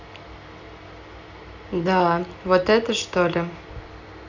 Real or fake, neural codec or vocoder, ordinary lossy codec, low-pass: real; none; none; 7.2 kHz